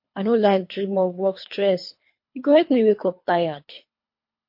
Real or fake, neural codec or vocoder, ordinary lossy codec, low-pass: fake; codec, 24 kHz, 3 kbps, HILCodec; MP3, 32 kbps; 5.4 kHz